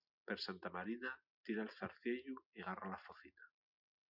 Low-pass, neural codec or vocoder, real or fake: 5.4 kHz; none; real